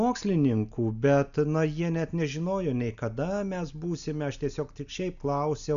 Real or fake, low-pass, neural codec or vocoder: real; 7.2 kHz; none